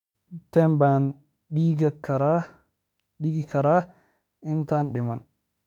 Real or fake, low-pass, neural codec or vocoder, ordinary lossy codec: fake; 19.8 kHz; autoencoder, 48 kHz, 32 numbers a frame, DAC-VAE, trained on Japanese speech; none